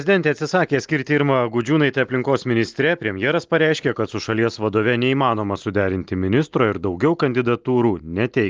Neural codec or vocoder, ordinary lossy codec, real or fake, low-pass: none; Opus, 32 kbps; real; 7.2 kHz